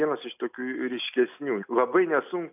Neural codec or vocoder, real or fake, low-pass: none; real; 3.6 kHz